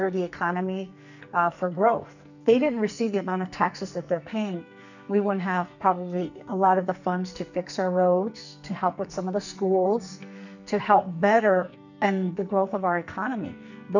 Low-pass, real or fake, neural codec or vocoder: 7.2 kHz; fake; codec, 44.1 kHz, 2.6 kbps, SNAC